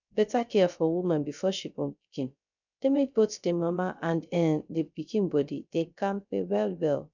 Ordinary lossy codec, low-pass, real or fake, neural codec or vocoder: none; 7.2 kHz; fake; codec, 16 kHz, 0.3 kbps, FocalCodec